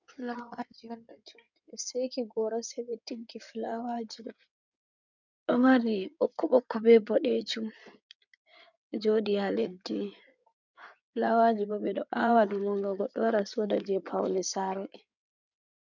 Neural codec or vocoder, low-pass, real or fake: codec, 16 kHz in and 24 kHz out, 2.2 kbps, FireRedTTS-2 codec; 7.2 kHz; fake